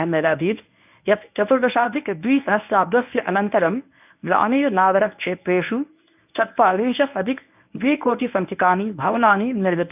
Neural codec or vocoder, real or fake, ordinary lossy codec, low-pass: codec, 24 kHz, 0.9 kbps, WavTokenizer, medium speech release version 1; fake; none; 3.6 kHz